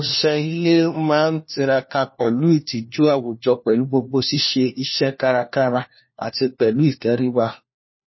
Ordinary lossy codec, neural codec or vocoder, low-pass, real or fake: MP3, 24 kbps; codec, 16 kHz, 1 kbps, FunCodec, trained on LibriTTS, 50 frames a second; 7.2 kHz; fake